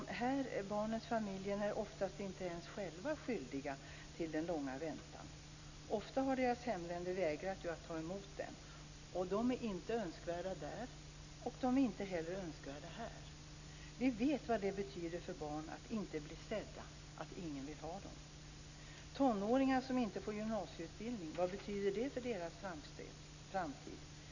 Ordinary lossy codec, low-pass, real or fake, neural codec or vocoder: none; 7.2 kHz; real; none